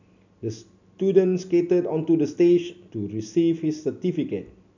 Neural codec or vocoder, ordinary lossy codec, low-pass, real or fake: none; none; 7.2 kHz; real